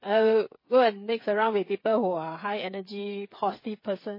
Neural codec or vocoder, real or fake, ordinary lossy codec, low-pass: codec, 16 kHz, 8 kbps, FreqCodec, smaller model; fake; MP3, 24 kbps; 5.4 kHz